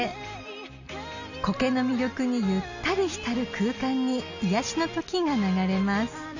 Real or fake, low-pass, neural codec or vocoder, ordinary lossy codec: real; 7.2 kHz; none; none